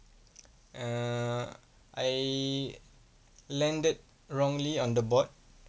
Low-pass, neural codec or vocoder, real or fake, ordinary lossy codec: none; none; real; none